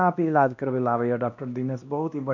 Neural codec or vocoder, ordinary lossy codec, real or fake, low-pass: codec, 16 kHz, 1 kbps, X-Codec, WavLM features, trained on Multilingual LibriSpeech; none; fake; 7.2 kHz